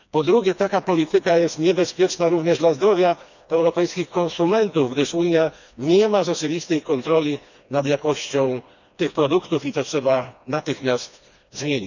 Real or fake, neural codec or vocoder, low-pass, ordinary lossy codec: fake; codec, 16 kHz, 2 kbps, FreqCodec, smaller model; 7.2 kHz; none